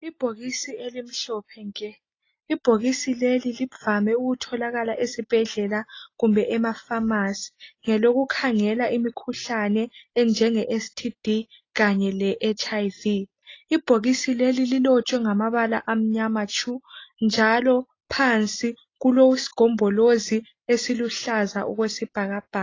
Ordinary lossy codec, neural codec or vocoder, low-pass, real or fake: AAC, 32 kbps; none; 7.2 kHz; real